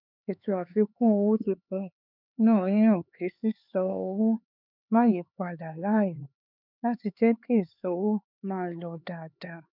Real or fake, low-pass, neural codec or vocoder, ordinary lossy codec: fake; 5.4 kHz; codec, 16 kHz, 4 kbps, X-Codec, HuBERT features, trained on LibriSpeech; none